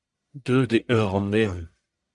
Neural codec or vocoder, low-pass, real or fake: codec, 44.1 kHz, 1.7 kbps, Pupu-Codec; 10.8 kHz; fake